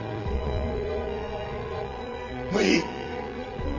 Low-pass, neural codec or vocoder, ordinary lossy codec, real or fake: 7.2 kHz; vocoder, 22.05 kHz, 80 mel bands, Vocos; none; fake